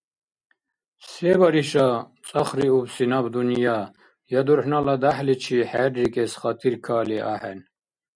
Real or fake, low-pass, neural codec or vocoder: real; 9.9 kHz; none